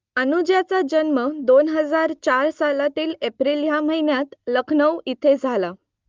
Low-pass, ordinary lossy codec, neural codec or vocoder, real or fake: 7.2 kHz; Opus, 32 kbps; none; real